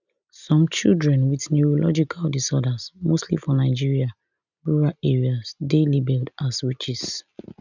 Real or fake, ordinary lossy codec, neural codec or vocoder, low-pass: real; none; none; 7.2 kHz